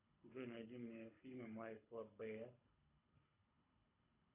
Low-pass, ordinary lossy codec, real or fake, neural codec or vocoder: 3.6 kHz; Opus, 64 kbps; fake; codec, 24 kHz, 6 kbps, HILCodec